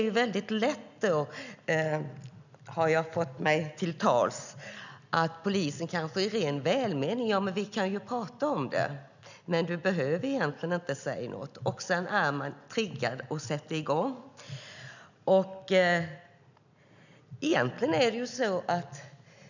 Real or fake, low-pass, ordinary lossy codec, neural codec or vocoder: real; 7.2 kHz; none; none